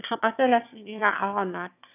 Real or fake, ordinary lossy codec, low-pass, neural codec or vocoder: fake; none; 3.6 kHz; autoencoder, 22.05 kHz, a latent of 192 numbers a frame, VITS, trained on one speaker